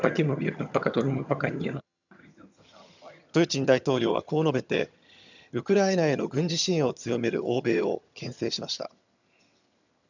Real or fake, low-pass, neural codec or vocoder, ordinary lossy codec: fake; 7.2 kHz; vocoder, 22.05 kHz, 80 mel bands, HiFi-GAN; none